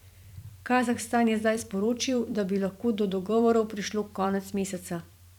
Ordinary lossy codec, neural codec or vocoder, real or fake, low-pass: none; vocoder, 44.1 kHz, 128 mel bands every 512 samples, BigVGAN v2; fake; 19.8 kHz